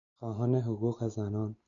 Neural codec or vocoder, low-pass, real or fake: none; 7.2 kHz; real